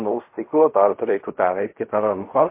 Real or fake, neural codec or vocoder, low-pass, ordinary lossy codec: fake; codec, 16 kHz in and 24 kHz out, 0.4 kbps, LongCat-Audio-Codec, fine tuned four codebook decoder; 3.6 kHz; MP3, 24 kbps